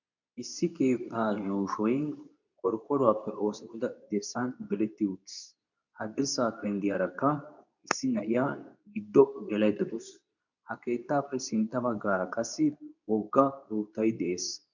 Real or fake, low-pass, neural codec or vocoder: fake; 7.2 kHz; codec, 24 kHz, 0.9 kbps, WavTokenizer, medium speech release version 2